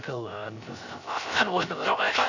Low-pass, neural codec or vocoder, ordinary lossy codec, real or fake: 7.2 kHz; codec, 16 kHz, 0.3 kbps, FocalCodec; none; fake